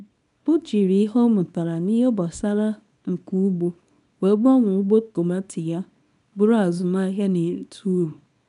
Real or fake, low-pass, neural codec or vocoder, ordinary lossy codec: fake; 10.8 kHz; codec, 24 kHz, 0.9 kbps, WavTokenizer, small release; none